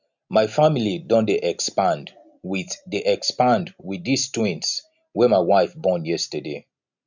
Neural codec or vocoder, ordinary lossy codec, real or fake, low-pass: none; none; real; 7.2 kHz